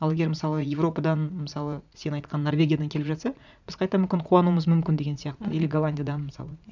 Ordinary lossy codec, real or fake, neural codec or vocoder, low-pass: none; fake; vocoder, 44.1 kHz, 80 mel bands, Vocos; 7.2 kHz